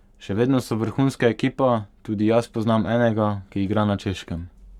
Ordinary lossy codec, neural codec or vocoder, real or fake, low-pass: none; codec, 44.1 kHz, 7.8 kbps, Pupu-Codec; fake; 19.8 kHz